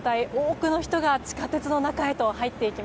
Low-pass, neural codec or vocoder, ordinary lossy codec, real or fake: none; none; none; real